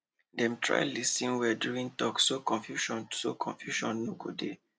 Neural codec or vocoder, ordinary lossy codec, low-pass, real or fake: none; none; none; real